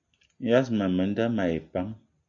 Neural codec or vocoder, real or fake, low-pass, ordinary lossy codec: none; real; 7.2 kHz; AAC, 64 kbps